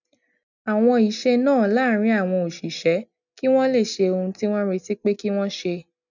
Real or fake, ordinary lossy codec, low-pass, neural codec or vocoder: real; none; 7.2 kHz; none